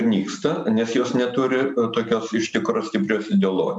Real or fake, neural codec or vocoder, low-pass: real; none; 10.8 kHz